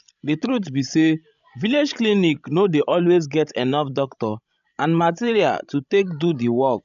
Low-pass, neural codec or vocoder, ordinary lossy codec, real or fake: 7.2 kHz; codec, 16 kHz, 16 kbps, FreqCodec, larger model; none; fake